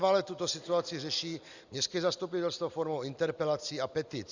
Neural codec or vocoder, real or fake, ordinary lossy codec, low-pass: none; real; Opus, 64 kbps; 7.2 kHz